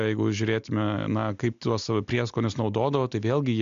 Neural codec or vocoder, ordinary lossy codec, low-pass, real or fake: none; MP3, 64 kbps; 7.2 kHz; real